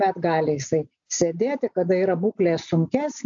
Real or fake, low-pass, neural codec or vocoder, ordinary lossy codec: real; 7.2 kHz; none; AAC, 64 kbps